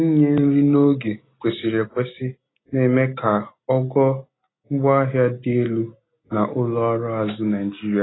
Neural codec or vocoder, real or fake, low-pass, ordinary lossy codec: none; real; 7.2 kHz; AAC, 16 kbps